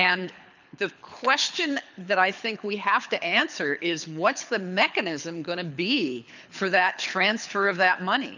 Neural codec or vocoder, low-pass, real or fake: codec, 24 kHz, 6 kbps, HILCodec; 7.2 kHz; fake